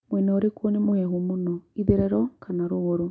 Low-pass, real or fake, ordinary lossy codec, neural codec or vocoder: none; real; none; none